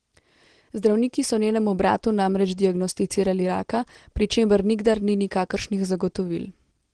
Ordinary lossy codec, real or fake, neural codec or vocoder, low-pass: Opus, 16 kbps; real; none; 9.9 kHz